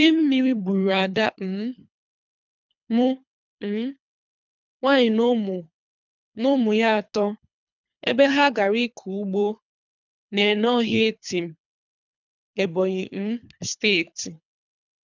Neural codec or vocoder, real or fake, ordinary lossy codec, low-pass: codec, 24 kHz, 3 kbps, HILCodec; fake; none; 7.2 kHz